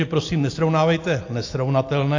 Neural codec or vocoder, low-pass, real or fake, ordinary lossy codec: none; 7.2 kHz; real; AAC, 48 kbps